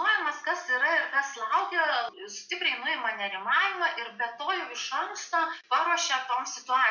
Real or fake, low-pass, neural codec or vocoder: real; 7.2 kHz; none